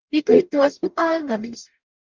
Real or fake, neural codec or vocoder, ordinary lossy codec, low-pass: fake; codec, 44.1 kHz, 0.9 kbps, DAC; Opus, 32 kbps; 7.2 kHz